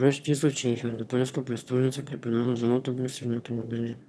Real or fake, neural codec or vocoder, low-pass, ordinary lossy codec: fake; autoencoder, 22.05 kHz, a latent of 192 numbers a frame, VITS, trained on one speaker; none; none